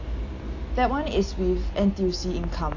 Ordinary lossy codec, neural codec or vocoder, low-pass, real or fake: AAC, 48 kbps; none; 7.2 kHz; real